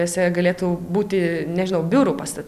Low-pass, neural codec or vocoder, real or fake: 14.4 kHz; none; real